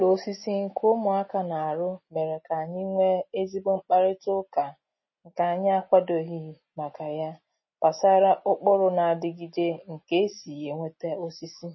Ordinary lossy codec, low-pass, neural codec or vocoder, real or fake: MP3, 24 kbps; 7.2 kHz; none; real